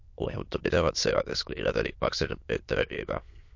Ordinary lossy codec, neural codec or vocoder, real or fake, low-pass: MP3, 48 kbps; autoencoder, 22.05 kHz, a latent of 192 numbers a frame, VITS, trained on many speakers; fake; 7.2 kHz